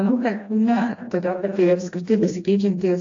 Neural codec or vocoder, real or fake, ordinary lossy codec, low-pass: codec, 16 kHz, 1 kbps, FreqCodec, smaller model; fake; AAC, 48 kbps; 7.2 kHz